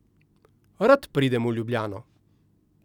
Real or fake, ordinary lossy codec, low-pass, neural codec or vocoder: real; none; 19.8 kHz; none